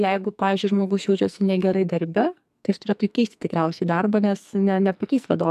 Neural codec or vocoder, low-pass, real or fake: codec, 44.1 kHz, 2.6 kbps, SNAC; 14.4 kHz; fake